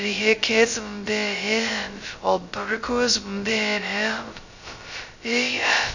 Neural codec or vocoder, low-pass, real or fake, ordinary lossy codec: codec, 16 kHz, 0.2 kbps, FocalCodec; 7.2 kHz; fake; none